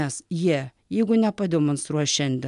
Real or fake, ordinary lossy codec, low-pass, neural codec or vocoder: real; MP3, 96 kbps; 10.8 kHz; none